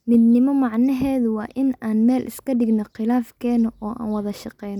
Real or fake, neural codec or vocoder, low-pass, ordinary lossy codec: real; none; 19.8 kHz; none